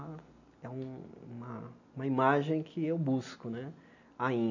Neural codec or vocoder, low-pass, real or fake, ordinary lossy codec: none; 7.2 kHz; real; none